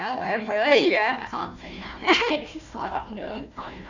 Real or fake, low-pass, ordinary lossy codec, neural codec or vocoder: fake; 7.2 kHz; none; codec, 16 kHz, 1 kbps, FunCodec, trained on Chinese and English, 50 frames a second